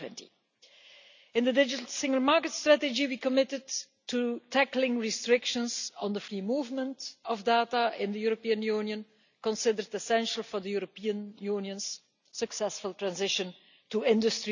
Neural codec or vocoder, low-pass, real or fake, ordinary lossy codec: none; 7.2 kHz; real; none